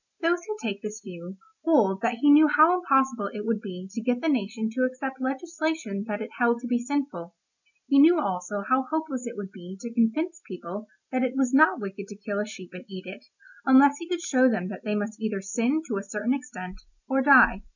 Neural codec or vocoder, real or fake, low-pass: none; real; 7.2 kHz